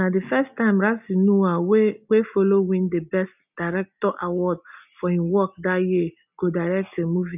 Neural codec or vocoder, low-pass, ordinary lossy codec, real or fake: none; 3.6 kHz; none; real